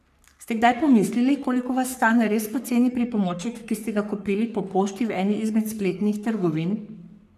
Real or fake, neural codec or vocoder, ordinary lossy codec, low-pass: fake; codec, 44.1 kHz, 3.4 kbps, Pupu-Codec; none; 14.4 kHz